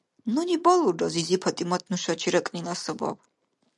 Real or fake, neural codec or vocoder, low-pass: real; none; 10.8 kHz